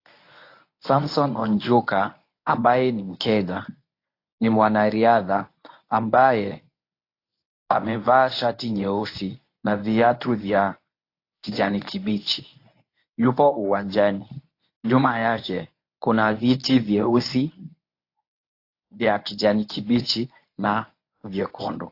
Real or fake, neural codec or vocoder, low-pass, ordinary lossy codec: fake; codec, 24 kHz, 0.9 kbps, WavTokenizer, medium speech release version 1; 5.4 kHz; AAC, 32 kbps